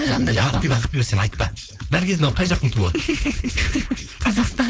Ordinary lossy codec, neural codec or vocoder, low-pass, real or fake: none; codec, 16 kHz, 4.8 kbps, FACodec; none; fake